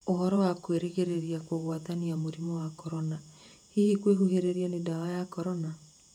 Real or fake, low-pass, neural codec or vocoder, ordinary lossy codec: fake; 19.8 kHz; vocoder, 48 kHz, 128 mel bands, Vocos; none